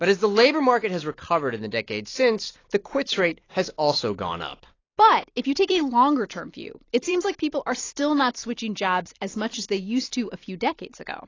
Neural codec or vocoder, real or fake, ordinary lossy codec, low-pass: vocoder, 44.1 kHz, 128 mel bands every 256 samples, BigVGAN v2; fake; AAC, 32 kbps; 7.2 kHz